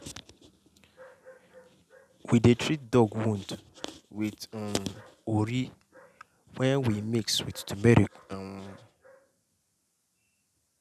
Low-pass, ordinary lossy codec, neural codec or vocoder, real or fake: 14.4 kHz; none; none; real